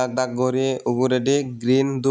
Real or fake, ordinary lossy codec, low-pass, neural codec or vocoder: real; none; none; none